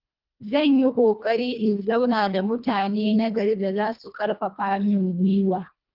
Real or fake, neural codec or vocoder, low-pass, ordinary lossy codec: fake; codec, 24 kHz, 1.5 kbps, HILCodec; 5.4 kHz; Opus, 32 kbps